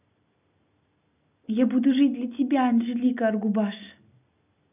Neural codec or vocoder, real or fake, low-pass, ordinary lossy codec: none; real; 3.6 kHz; none